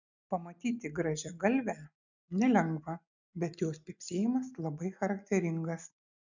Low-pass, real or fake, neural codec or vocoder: 7.2 kHz; real; none